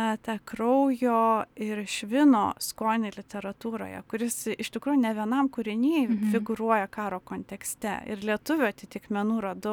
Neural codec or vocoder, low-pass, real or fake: none; 19.8 kHz; real